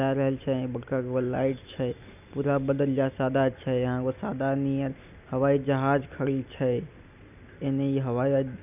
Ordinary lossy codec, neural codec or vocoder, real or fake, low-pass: none; none; real; 3.6 kHz